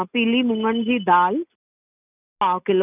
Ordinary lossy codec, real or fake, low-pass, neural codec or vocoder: none; real; 3.6 kHz; none